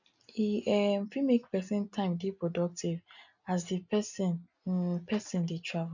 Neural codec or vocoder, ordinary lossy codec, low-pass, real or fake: none; none; 7.2 kHz; real